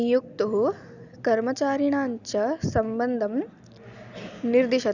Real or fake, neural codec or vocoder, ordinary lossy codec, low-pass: real; none; none; 7.2 kHz